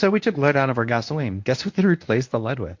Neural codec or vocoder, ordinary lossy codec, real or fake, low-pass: codec, 24 kHz, 0.9 kbps, WavTokenizer, medium speech release version 1; MP3, 48 kbps; fake; 7.2 kHz